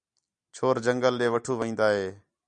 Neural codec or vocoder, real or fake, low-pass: none; real; 9.9 kHz